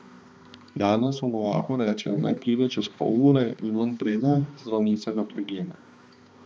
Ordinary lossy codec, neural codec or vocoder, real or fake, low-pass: none; codec, 16 kHz, 2 kbps, X-Codec, HuBERT features, trained on balanced general audio; fake; none